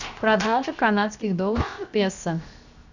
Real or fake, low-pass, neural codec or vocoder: fake; 7.2 kHz; codec, 16 kHz, 0.7 kbps, FocalCodec